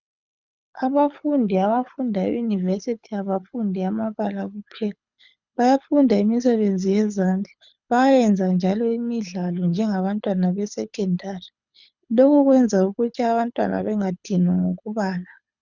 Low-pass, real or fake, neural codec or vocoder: 7.2 kHz; fake; codec, 24 kHz, 6 kbps, HILCodec